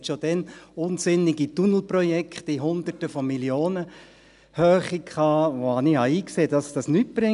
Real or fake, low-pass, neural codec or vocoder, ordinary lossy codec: real; 10.8 kHz; none; none